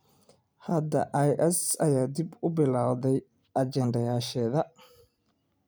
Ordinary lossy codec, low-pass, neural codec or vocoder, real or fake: none; none; vocoder, 44.1 kHz, 128 mel bands every 256 samples, BigVGAN v2; fake